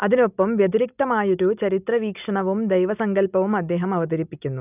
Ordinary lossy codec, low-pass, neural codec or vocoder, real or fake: none; 3.6 kHz; none; real